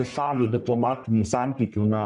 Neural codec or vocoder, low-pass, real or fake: codec, 44.1 kHz, 1.7 kbps, Pupu-Codec; 10.8 kHz; fake